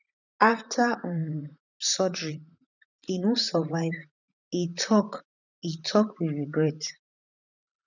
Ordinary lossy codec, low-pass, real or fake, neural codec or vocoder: none; 7.2 kHz; fake; vocoder, 44.1 kHz, 128 mel bands, Pupu-Vocoder